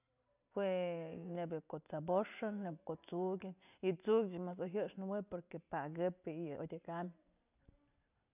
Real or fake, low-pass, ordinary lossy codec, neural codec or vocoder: real; 3.6 kHz; none; none